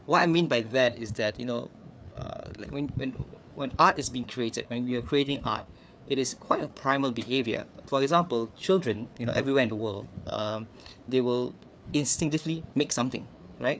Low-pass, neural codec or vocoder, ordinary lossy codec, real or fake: none; codec, 16 kHz, 4 kbps, FreqCodec, larger model; none; fake